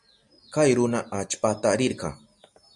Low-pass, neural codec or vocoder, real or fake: 10.8 kHz; none; real